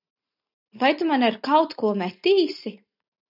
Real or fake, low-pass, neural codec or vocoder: real; 5.4 kHz; none